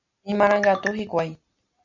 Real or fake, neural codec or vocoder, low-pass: real; none; 7.2 kHz